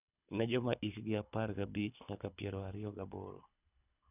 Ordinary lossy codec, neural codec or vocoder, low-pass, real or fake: none; codec, 24 kHz, 6 kbps, HILCodec; 3.6 kHz; fake